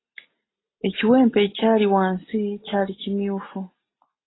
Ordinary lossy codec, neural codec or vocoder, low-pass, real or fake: AAC, 16 kbps; none; 7.2 kHz; real